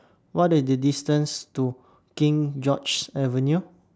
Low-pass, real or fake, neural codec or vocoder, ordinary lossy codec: none; real; none; none